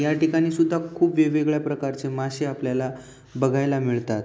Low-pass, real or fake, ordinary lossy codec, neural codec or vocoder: none; real; none; none